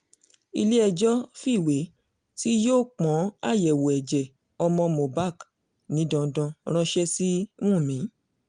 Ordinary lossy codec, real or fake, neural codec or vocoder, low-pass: Opus, 32 kbps; real; none; 9.9 kHz